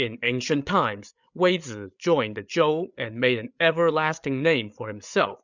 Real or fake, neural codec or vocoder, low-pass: fake; codec, 16 kHz, 8 kbps, FreqCodec, larger model; 7.2 kHz